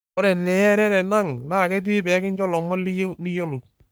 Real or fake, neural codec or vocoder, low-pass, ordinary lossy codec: fake; codec, 44.1 kHz, 3.4 kbps, Pupu-Codec; none; none